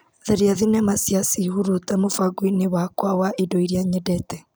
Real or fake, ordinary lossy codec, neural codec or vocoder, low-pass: real; none; none; none